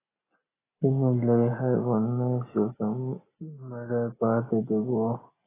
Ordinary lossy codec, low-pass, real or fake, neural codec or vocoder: AAC, 16 kbps; 3.6 kHz; real; none